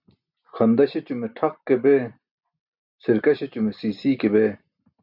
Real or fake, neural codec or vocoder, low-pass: real; none; 5.4 kHz